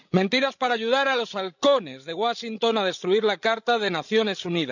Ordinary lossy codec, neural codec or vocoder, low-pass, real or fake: none; codec, 16 kHz, 16 kbps, FreqCodec, larger model; 7.2 kHz; fake